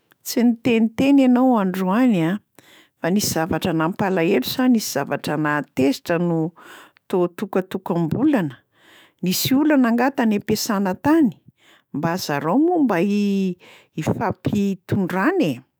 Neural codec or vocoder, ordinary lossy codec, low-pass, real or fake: autoencoder, 48 kHz, 128 numbers a frame, DAC-VAE, trained on Japanese speech; none; none; fake